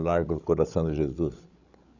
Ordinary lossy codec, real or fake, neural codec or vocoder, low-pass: none; fake; codec, 16 kHz, 16 kbps, FreqCodec, larger model; 7.2 kHz